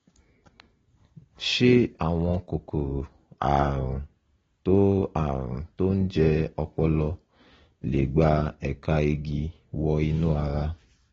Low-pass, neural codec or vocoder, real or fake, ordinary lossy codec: 7.2 kHz; none; real; AAC, 24 kbps